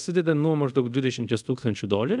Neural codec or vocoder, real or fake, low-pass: codec, 24 kHz, 0.5 kbps, DualCodec; fake; 10.8 kHz